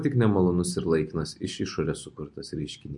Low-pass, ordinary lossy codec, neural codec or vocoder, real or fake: 10.8 kHz; MP3, 48 kbps; none; real